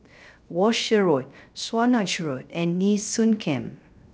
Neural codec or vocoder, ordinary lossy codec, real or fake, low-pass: codec, 16 kHz, 0.3 kbps, FocalCodec; none; fake; none